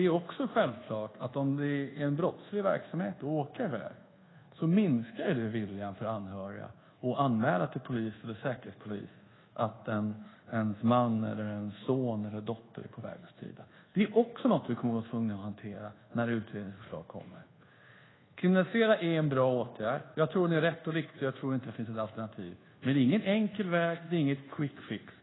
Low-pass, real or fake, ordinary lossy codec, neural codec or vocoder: 7.2 kHz; fake; AAC, 16 kbps; codec, 24 kHz, 1.2 kbps, DualCodec